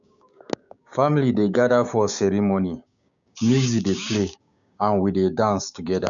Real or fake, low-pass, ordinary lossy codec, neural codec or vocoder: fake; 7.2 kHz; none; codec, 16 kHz, 6 kbps, DAC